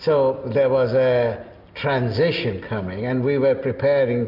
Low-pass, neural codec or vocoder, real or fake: 5.4 kHz; none; real